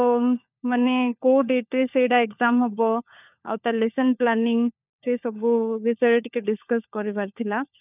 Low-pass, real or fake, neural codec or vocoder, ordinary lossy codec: 3.6 kHz; fake; codec, 16 kHz, 4 kbps, FunCodec, trained on LibriTTS, 50 frames a second; none